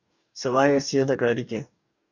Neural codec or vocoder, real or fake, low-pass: codec, 44.1 kHz, 2.6 kbps, DAC; fake; 7.2 kHz